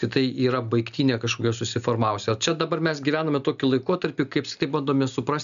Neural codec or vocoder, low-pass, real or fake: none; 7.2 kHz; real